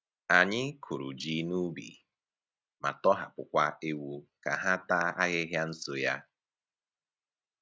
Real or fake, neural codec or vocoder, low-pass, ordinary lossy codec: real; none; none; none